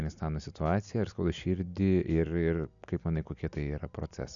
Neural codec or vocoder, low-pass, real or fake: none; 7.2 kHz; real